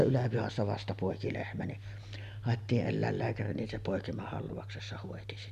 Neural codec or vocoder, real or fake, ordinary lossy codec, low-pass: none; real; none; 14.4 kHz